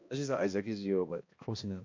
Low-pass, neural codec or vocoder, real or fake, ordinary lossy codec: 7.2 kHz; codec, 16 kHz, 1 kbps, X-Codec, HuBERT features, trained on balanced general audio; fake; MP3, 48 kbps